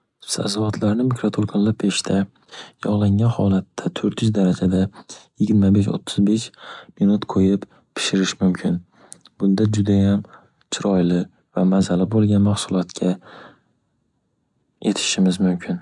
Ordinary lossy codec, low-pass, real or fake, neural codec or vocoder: none; 10.8 kHz; real; none